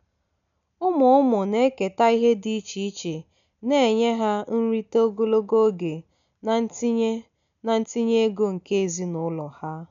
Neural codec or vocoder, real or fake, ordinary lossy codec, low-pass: none; real; none; 7.2 kHz